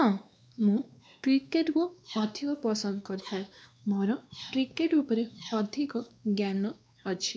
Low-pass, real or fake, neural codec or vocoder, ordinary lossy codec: none; fake; codec, 16 kHz, 2 kbps, X-Codec, WavLM features, trained on Multilingual LibriSpeech; none